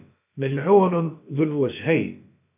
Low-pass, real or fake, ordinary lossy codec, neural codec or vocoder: 3.6 kHz; fake; MP3, 32 kbps; codec, 16 kHz, about 1 kbps, DyCAST, with the encoder's durations